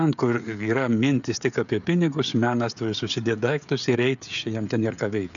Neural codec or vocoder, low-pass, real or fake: codec, 16 kHz, 16 kbps, FreqCodec, smaller model; 7.2 kHz; fake